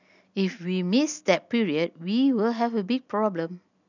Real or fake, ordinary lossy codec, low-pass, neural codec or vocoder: real; none; 7.2 kHz; none